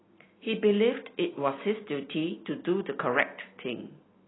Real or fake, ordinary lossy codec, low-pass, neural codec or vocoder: real; AAC, 16 kbps; 7.2 kHz; none